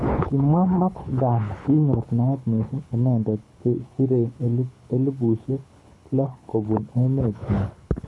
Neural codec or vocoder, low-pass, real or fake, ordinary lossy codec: codec, 24 kHz, 6 kbps, HILCodec; none; fake; none